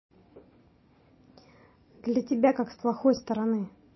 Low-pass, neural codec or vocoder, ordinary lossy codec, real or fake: 7.2 kHz; none; MP3, 24 kbps; real